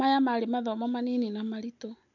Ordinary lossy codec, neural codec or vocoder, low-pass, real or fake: none; none; 7.2 kHz; real